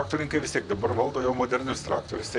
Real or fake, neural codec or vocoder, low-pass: fake; vocoder, 44.1 kHz, 128 mel bands, Pupu-Vocoder; 10.8 kHz